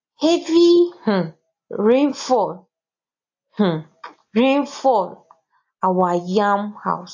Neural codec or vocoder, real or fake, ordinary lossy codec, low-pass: none; real; AAC, 48 kbps; 7.2 kHz